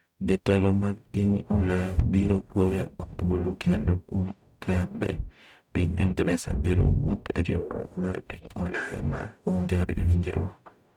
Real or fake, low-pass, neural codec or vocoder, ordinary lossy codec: fake; 19.8 kHz; codec, 44.1 kHz, 0.9 kbps, DAC; none